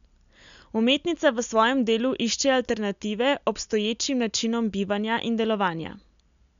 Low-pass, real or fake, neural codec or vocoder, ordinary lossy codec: 7.2 kHz; real; none; MP3, 96 kbps